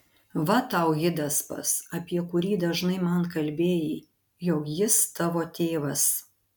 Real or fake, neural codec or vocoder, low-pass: real; none; 19.8 kHz